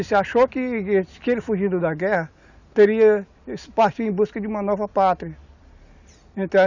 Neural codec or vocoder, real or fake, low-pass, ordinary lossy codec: none; real; 7.2 kHz; none